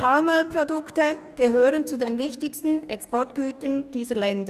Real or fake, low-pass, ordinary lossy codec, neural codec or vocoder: fake; 14.4 kHz; none; codec, 44.1 kHz, 2.6 kbps, DAC